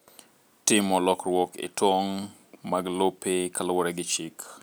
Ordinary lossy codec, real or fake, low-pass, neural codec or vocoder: none; real; none; none